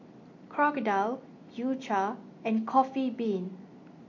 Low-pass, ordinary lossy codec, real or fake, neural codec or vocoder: 7.2 kHz; MP3, 48 kbps; real; none